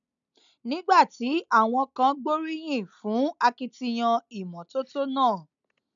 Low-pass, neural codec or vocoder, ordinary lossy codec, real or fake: 7.2 kHz; none; none; real